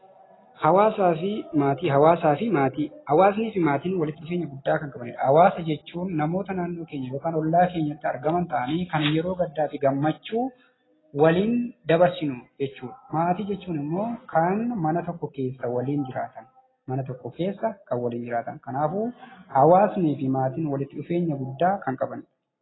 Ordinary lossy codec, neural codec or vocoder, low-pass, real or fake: AAC, 16 kbps; none; 7.2 kHz; real